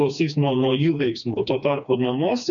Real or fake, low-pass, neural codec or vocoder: fake; 7.2 kHz; codec, 16 kHz, 2 kbps, FreqCodec, smaller model